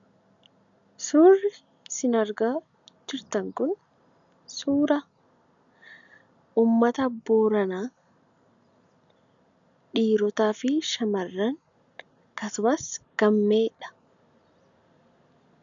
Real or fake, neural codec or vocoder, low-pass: real; none; 7.2 kHz